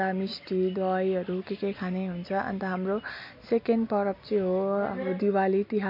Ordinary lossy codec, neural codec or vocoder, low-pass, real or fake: MP3, 32 kbps; none; 5.4 kHz; real